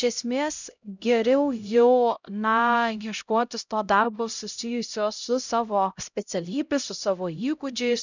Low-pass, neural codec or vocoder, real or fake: 7.2 kHz; codec, 16 kHz, 0.5 kbps, X-Codec, HuBERT features, trained on LibriSpeech; fake